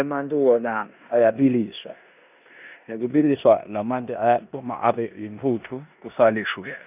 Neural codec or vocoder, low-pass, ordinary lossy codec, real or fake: codec, 16 kHz in and 24 kHz out, 0.9 kbps, LongCat-Audio-Codec, four codebook decoder; 3.6 kHz; none; fake